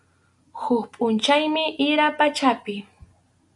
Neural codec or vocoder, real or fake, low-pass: none; real; 10.8 kHz